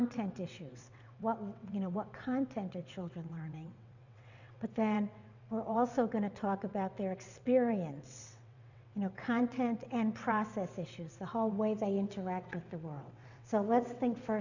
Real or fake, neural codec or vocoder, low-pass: fake; vocoder, 22.05 kHz, 80 mel bands, WaveNeXt; 7.2 kHz